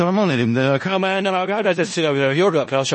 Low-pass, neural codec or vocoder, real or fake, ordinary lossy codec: 10.8 kHz; codec, 16 kHz in and 24 kHz out, 0.4 kbps, LongCat-Audio-Codec, four codebook decoder; fake; MP3, 32 kbps